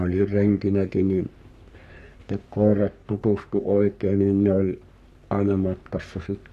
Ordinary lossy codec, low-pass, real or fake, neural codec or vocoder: none; 14.4 kHz; fake; codec, 44.1 kHz, 3.4 kbps, Pupu-Codec